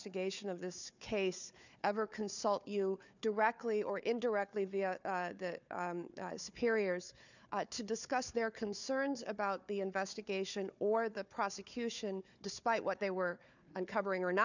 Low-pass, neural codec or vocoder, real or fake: 7.2 kHz; codec, 16 kHz, 4 kbps, FunCodec, trained on Chinese and English, 50 frames a second; fake